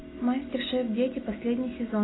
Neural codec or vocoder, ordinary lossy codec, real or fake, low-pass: none; AAC, 16 kbps; real; 7.2 kHz